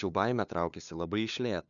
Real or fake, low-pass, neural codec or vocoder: fake; 7.2 kHz; codec, 16 kHz, 2 kbps, FunCodec, trained on LibriTTS, 25 frames a second